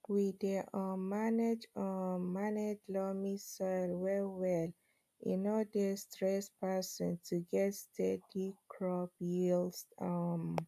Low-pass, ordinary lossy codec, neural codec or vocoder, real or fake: 14.4 kHz; AAC, 96 kbps; none; real